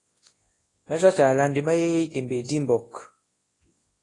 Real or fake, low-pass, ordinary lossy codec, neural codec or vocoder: fake; 10.8 kHz; AAC, 32 kbps; codec, 24 kHz, 0.9 kbps, WavTokenizer, large speech release